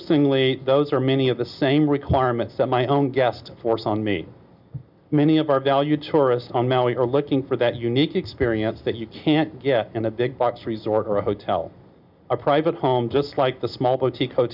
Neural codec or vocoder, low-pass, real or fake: none; 5.4 kHz; real